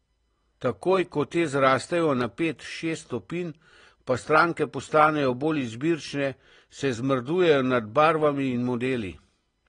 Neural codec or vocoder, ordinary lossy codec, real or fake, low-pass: none; AAC, 32 kbps; real; 9.9 kHz